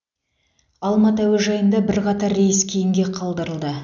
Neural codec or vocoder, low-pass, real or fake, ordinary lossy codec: none; 7.2 kHz; real; none